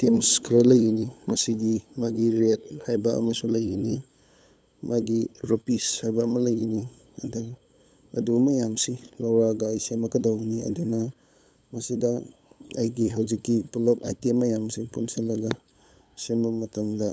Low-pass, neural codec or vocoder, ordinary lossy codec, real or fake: none; codec, 16 kHz, 8 kbps, FunCodec, trained on LibriTTS, 25 frames a second; none; fake